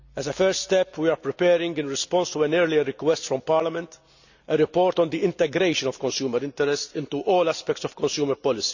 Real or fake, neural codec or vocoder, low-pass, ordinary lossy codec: real; none; 7.2 kHz; none